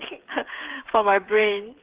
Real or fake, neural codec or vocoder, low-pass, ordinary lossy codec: fake; codec, 16 kHz, 8 kbps, FreqCodec, smaller model; 3.6 kHz; Opus, 16 kbps